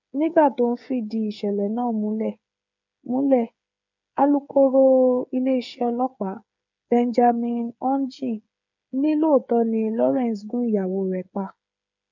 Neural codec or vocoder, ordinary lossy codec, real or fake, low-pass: codec, 16 kHz, 8 kbps, FreqCodec, smaller model; none; fake; 7.2 kHz